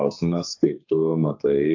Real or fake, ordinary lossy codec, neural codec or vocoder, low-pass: fake; AAC, 48 kbps; codec, 32 kHz, 1.9 kbps, SNAC; 7.2 kHz